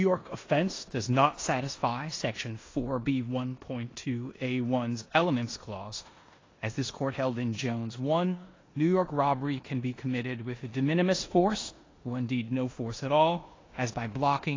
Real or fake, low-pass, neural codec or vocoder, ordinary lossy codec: fake; 7.2 kHz; codec, 16 kHz in and 24 kHz out, 0.9 kbps, LongCat-Audio-Codec, four codebook decoder; AAC, 32 kbps